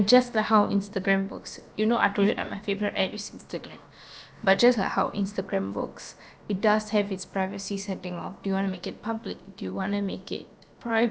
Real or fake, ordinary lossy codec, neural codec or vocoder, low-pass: fake; none; codec, 16 kHz, about 1 kbps, DyCAST, with the encoder's durations; none